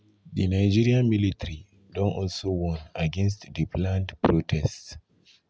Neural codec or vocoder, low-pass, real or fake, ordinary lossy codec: none; none; real; none